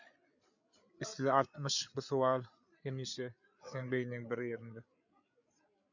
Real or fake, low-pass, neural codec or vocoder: fake; 7.2 kHz; codec, 16 kHz, 8 kbps, FreqCodec, larger model